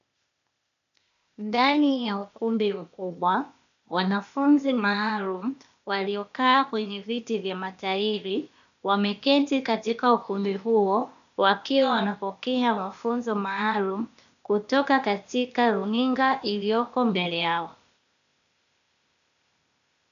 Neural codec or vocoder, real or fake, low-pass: codec, 16 kHz, 0.8 kbps, ZipCodec; fake; 7.2 kHz